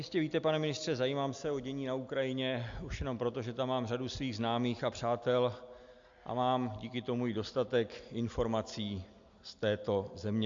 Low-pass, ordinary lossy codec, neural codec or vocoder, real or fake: 7.2 kHz; AAC, 64 kbps; none; real